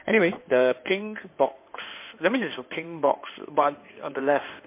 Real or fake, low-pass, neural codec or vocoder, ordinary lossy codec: fake; 3.6 kHz; codec, 16 kHz in and 24 kHz out, 2.2 kbps, FireRedTTS-2 codec; MP3, 24 kbps